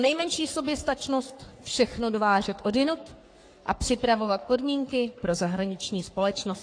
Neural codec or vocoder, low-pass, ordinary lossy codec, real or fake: codec, 44.1 kHz, 3.4 kbps, Pupu-Codec; 9.9 kHz; AAC, 48 kbps; fake